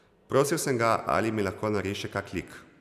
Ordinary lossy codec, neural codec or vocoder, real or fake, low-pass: none; none; real; 14.4 kHz